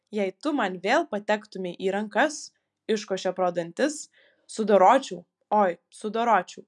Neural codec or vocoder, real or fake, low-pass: none; real; 10.8 kHz